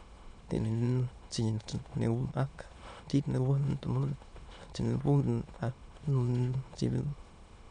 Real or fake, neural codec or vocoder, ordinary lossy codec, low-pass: fake; autoencoder, 22.05 kHz, a latent of 192 numbers a frame, VITS, trained on many speakers; none; 9.9 kHz